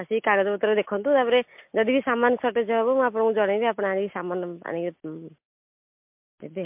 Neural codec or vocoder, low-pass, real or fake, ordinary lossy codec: none; 3.6 kHz; real; MP3, 32 kbps